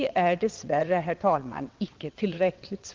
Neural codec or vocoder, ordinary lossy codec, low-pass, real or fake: vocoder, 22.05 kHz, 80 mel bands, WaveNeXt; Opus, 32 kbps; 7.2 kHz; fake